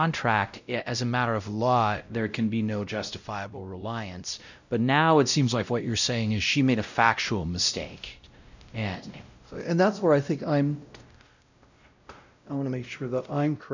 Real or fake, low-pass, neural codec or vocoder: fake; 7.2 kHz; codec, 16 kHz, 0.5 kbps, X-Codec, WavLM features, trained on Multilingual LibriSpeech